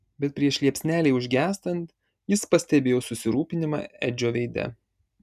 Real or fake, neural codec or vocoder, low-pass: real; none; 14.4 kHz